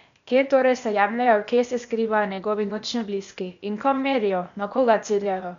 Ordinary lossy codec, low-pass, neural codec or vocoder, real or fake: none; 7.2 kHz; codec, 16 kHz, 0.8 kbps, ZipCodec; fake